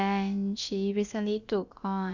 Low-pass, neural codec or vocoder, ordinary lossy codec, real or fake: 7.2 kHz; codec, 16 kHz, about 1 kbps, DyCAST, with the encoder's durations; Opus, 64 kbps; fake